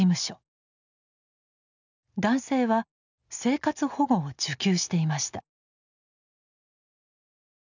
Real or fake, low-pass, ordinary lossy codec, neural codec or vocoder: real; 7.2 kHz; none; none